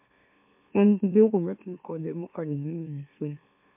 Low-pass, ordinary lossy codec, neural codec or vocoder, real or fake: 3.6 kHz; MP3, 32 kbps; autoencoder, 44.1 kHz, a latent of 192 numbers a frame, MeloTTS; fake